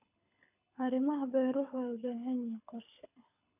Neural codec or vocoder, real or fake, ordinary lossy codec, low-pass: codec, 24 kHz, 6 kbps, HILCodec; fake; none; 3.6 kHz